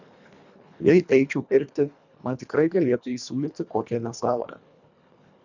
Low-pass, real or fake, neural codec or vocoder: 7.2 kHz; fake; codec, 24 kHz, 1.5 kbps, HILCodec